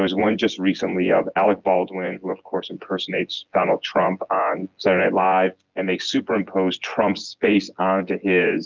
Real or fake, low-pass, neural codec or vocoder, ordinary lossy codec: fake; 7.2 kHz; vocoder, 24 kHz, 100 mel bands, Vocos; Opus, 32 kbps